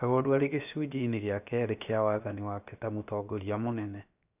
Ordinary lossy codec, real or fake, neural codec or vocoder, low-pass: none; fake; codec, 16 kHz, 0.7 kbps, FocalCodec; 3.6 kHz